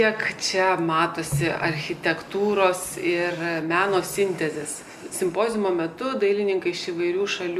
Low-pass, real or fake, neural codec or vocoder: 14.4 kHz; real; none